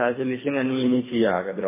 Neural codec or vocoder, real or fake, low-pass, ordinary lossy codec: codec, 24 kHz, 3 kbps, HILCodec; fake; 3.6 kHz; MP3, 16 kbps